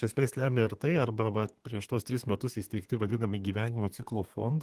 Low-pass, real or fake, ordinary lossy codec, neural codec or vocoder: 14.4 kHz; fake; Opus, 24 kbps; codec, 32 kHz, 1.9 kbps, SNAC